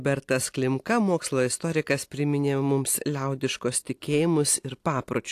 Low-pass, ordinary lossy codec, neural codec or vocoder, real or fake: 14.4 kHz; AAC, 64 kbps; none; real